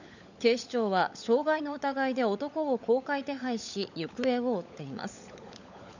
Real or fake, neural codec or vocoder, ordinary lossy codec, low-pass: fake; codec, 16 kHz, 16 kbps, FunCodec, trained on LibriTTS, 50 frames a second; none; 7.2 kHz